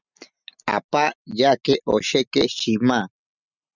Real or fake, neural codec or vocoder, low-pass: real; none; 7.2 kHz